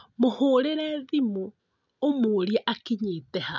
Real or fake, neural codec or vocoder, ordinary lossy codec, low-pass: real; none; none; 7.2 kHz